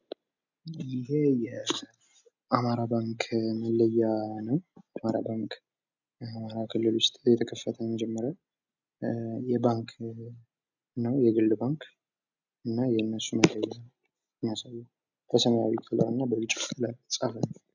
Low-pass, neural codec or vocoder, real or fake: 7.2 kHz; none; real